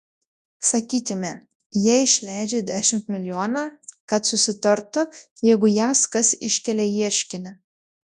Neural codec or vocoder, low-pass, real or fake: codec, 24 kHz, 0.9 kbps, WavTokenizer, large speech release; 10.8 kHz; fake